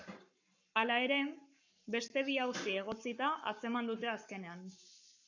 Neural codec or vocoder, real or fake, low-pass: codec, 44.1 kHz, 7.8 kbps, Pupu-Codec; fake; 7.2 kHz